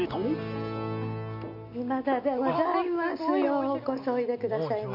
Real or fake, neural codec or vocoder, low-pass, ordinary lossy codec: real; none; 5.4 kHz; none